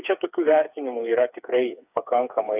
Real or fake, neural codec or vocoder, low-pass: fake; codec, 16 kHz, 4 kbps, FreqCodec, smaller model; 3.6 kHz